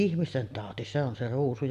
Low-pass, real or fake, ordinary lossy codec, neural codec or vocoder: 14.4 kHz; real; none; none